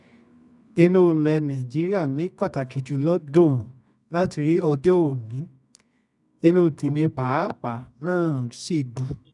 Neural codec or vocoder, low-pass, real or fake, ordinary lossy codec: codec, 24 kHz, 0.9 kbps, WavTokenizer, medium music audio release; 10.8 kHz; fake; none